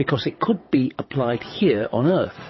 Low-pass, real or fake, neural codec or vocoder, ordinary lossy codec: 7.2 kHz; fake; vocoder, 22.05 kHz, 80 mel bands, WaveNeXt; MP3, 24 kbps